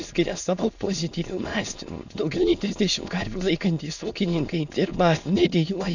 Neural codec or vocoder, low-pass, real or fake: autoencoder, 22.05 kHz, a latent of 192 numbers a frame, VITS, trained on many speakers; 7.2 kHz; fake